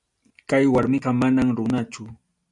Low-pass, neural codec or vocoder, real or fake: 10.8 kHz; none; real